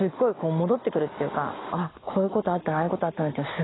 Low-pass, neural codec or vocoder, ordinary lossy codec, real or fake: 7.2 kHz; none; AAC, 16 kbps; real